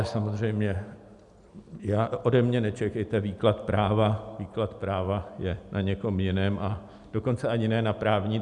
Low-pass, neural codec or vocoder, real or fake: 10.8 kHz; none; real